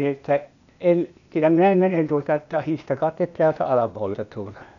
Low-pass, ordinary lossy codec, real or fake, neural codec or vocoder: 7.2 kHz; none; fake; codec, 16 kHz, 0.8 kbps, ZipCodec